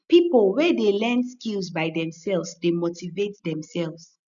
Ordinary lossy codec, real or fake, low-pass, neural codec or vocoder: none; real; 7.2 kHz; none